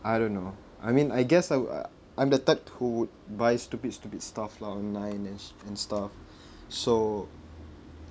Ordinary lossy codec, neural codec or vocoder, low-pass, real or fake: none; none; none; real